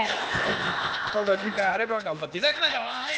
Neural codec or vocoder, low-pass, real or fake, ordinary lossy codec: codec, 16 kHz, 0.8 kbps, ZipCodec; none; fake; none